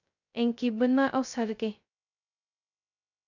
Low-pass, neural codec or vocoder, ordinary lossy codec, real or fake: 7.2 kHz; codec, 16 kHz, 0.2 kbps, FocalCodec; none; fake